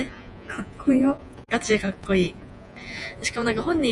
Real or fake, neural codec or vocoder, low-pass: fake; vocoder, 48 kHz, 128 mel bands, Vocos; 10.8 kHz